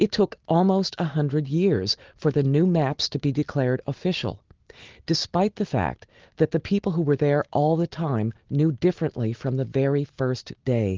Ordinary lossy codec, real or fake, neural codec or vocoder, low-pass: Opus, 16 kbps; real; none; 7.2 kHz